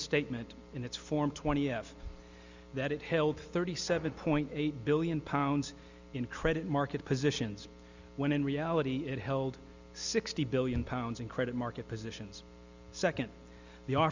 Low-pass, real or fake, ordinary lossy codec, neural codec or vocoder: 7.2 kHz; real; Opus, 64 kbps; none